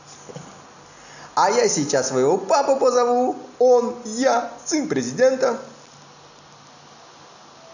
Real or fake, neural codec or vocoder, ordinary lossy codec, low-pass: real; none; none; 7.2 kHz